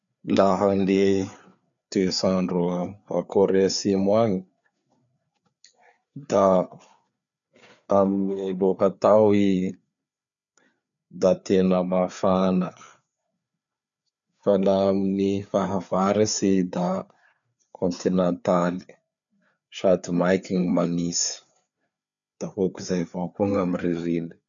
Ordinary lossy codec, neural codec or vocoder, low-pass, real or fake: none; codec, 16 kHz, 4 kbps, FreqCodec, larger model; 7.2 kHz; fake